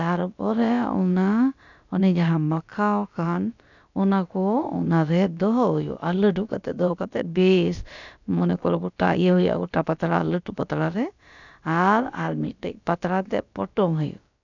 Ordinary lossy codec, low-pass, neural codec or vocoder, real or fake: none; 7.2 kHz; codec, 16 kHz, about 1 kbps, DyCAST, with the encoder's durations; fake